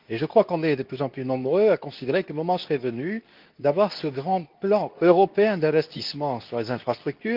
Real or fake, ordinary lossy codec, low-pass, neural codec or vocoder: fake; Opus, 32 kbps; 5.4 kHz; codec, 24 kHz, 0.9 kbps, WavTokenizer, medium speech release version 2